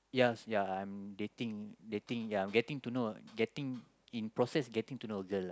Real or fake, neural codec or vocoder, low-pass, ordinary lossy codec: real; none; none; none